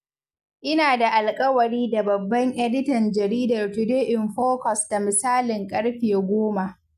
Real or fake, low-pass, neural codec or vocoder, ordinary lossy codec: real; 14.4 kHz; none; none